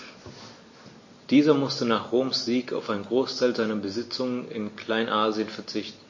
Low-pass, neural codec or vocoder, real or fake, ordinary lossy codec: 7.2 kHz; none; real; MP3, 32 kbps